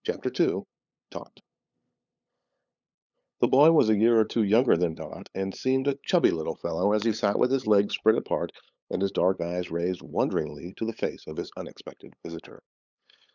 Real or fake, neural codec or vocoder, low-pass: fake; codec, 16 kHz, 8 kbps, FunCodec, trained on LibriTTS, 25 frames a second; 7.2 kHz